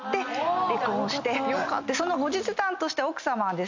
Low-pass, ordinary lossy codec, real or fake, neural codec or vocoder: 7.2 kHz; MP3, 64 kbps; real; none